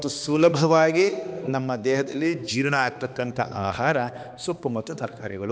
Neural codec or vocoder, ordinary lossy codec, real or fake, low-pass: codec, 16 kHz, 2 kbps, X-Codec, HuBERT features, trained on balanced general audio; none; fake; none